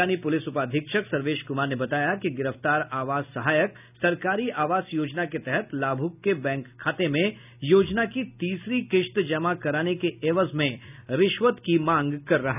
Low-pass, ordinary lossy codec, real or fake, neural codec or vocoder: 3.6 kHz; none; real; none